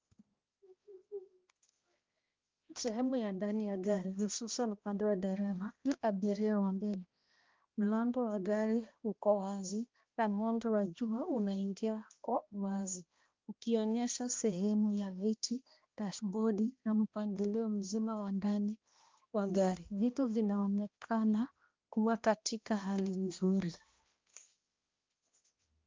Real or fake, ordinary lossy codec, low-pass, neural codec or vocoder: fake; Opus, 24 kbps; 7.2 kHz; codec, 16 kHz, 1 kbps, X-Codec, HuBERT features, trained on balanced general audio